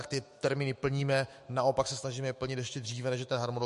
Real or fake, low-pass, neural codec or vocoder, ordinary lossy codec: fake; 14.4 kHz; autoencoder, 48 kHz, 128 numbers a frame, DAC-VAE, trained on Japanese speech; MP3, 48 kbps